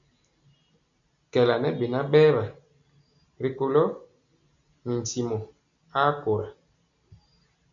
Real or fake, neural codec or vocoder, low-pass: real; none; 7.2 kHz